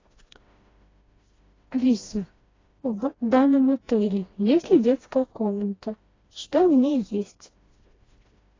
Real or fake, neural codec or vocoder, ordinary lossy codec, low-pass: fake; codec, 16 kHz, 1 kbps, FreqCodec, smaller model; AAC, 32 kbps; 7.2 kHz